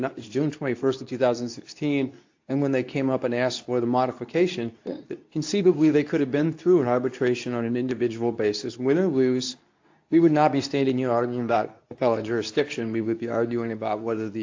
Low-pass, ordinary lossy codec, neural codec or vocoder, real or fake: 7.2 kHz; AAC, 48 kbps; codec, 24 kHz, 0.9 kbps, WavTokenizer, medium speech release version 2; fake